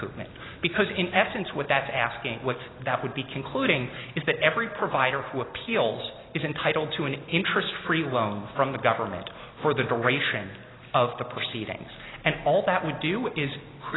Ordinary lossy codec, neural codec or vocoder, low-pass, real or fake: AAC, 16 kbps; none; 7.2 kHz; real